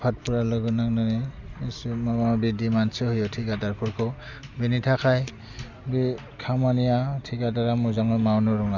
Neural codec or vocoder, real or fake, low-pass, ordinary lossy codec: none; real; 7.2 kHz; none